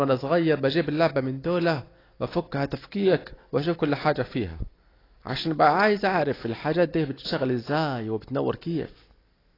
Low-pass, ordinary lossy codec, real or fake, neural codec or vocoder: 5.4 kHz; AAC, 24 kbps; real; none